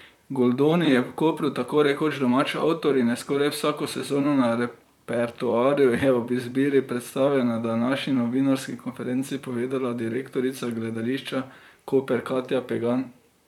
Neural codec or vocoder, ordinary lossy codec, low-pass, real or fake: vocoder, 44.1 kHz, 128 mel bands, Pupu-Vocoder; none; 19.8 kHz; fake